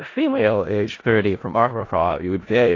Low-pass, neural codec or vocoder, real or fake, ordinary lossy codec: 7.2 kHz; codec, 16 kHz in and 24 kHz out, 0.4 kbps, LongCat-Audio-Codec, four codebook decoder; fake; AAC, 32 kbps